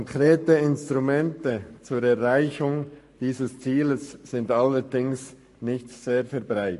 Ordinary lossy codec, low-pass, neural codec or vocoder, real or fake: MP3, 48 kbps; 14.4 kHz; codec, 44.1 kHz, 7.8 kbps, Pupu-Codec; fake